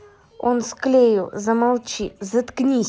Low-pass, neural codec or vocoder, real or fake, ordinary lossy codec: none; none; real; none